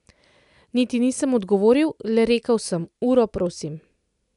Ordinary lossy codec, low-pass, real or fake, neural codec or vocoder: none; 10.8 kHz; real; none